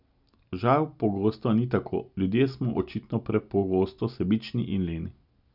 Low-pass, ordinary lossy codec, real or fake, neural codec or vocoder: 5.4 kHz; none; real; none